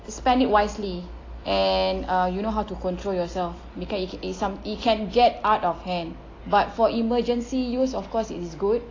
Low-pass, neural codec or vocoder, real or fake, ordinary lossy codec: 7.2 kHz; none; real; AAC, 32 kbps